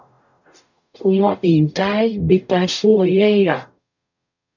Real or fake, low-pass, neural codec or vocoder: fake; 7.2 kHz; codec, 44.1 kHz, 0.9 kbps, DAC